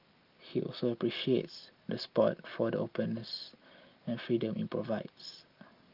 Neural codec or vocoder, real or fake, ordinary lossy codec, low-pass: none; real; Opus, 32 kbps; 5.4 kHz